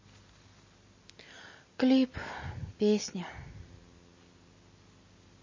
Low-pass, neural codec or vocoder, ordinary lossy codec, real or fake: 7.2 kHz; none; MP3, 32 kbps; real